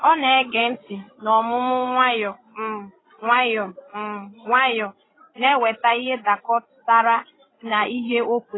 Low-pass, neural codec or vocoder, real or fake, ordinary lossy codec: 7.2 kHz; codec, 16 kHz, 16 kbps, FreqCodec, larger model; fake; AAC, 16 kbps